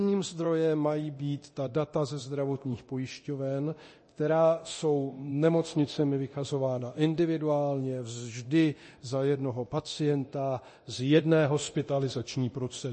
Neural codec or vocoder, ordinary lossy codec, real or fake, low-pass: codec, 24 kHz, 0.9 kbps, DualCodec; MP3, 32 kbps; fake; 10.8 kHz